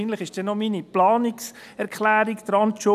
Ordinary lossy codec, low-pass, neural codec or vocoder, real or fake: none; 14.4 kHz; none; real